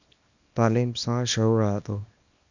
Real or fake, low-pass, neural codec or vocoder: fake; 7.2 kHz; codec, 24 kHz, 0.9 kbps, WavTokenizer, small release